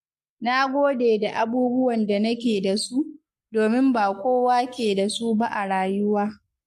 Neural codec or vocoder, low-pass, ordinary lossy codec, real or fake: codec, 44.1 kHz, 7.8 kbps, Pupu-Codec; 14.4 kHz; MP3, 64 kbps; fake